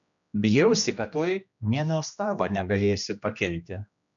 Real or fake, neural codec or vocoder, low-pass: fake; codec, 16 kHz, 1 kbps, X-Codec, HuBERT features, trained on general audio; 7.2 kHz